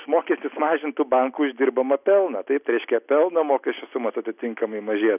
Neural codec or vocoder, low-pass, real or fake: none; 3.6 kHz; real